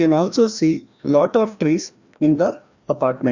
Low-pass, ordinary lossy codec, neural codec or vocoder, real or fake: 7.2 kHz; Opus, 64 kbps; codec, 16 kHz, 1 kbps, FreqCodec, larger model; fake